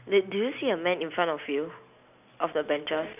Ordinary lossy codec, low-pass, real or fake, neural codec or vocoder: none; 3.6 kHz; real; none